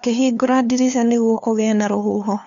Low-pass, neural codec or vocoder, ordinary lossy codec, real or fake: 7.2 kHz; codec, 16 kHz, 2 kbps, FunCodec, trained on LibriTTS, 25 frames a second; none; fake